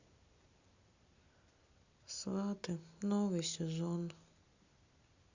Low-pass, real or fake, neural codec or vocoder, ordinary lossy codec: 7.2 kHz; real; none; Opus, 64 kbps